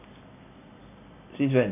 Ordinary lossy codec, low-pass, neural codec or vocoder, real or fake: none; 3.6 kHz; none; real